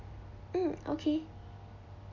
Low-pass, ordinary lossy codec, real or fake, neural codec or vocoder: 7.2 kHz; none; real; none